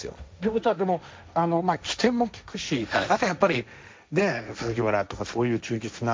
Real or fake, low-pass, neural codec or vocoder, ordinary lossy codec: fake; none; codec, 16 kHz, 1.1 kbps, Voila-Tokenizer; none